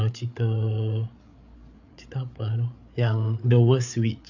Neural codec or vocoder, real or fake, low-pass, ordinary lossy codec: codec, 16 kHz, 8 kbps, FreqCodec, larger model; fake; 7.2 kHz; none